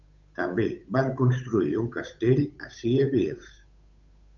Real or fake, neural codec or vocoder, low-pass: fake; codec, 16 kHz, 8 kbps, FunCodec, trained on Chinese and English, 25 frames a second; 7.2 kHz